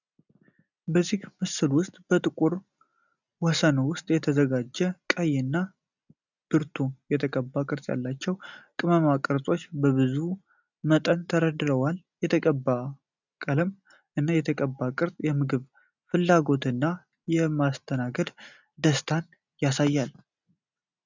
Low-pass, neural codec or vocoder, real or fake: 7.2 kHz; none; real